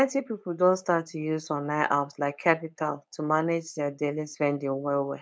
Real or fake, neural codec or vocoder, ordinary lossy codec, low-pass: fake; codec, 16 kHz, 4.8 kbps, FACodec; none; none